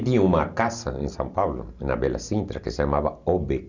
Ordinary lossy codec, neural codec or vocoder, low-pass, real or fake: none; none; 7.2 kHz; real